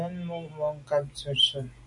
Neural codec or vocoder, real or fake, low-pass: none; real; 10.8 kHz